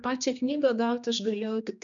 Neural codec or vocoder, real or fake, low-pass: codec, 16 kHz, 2 kbps, X-Codec, HuBERT features, trained on general audio; fake; 7.2 kHz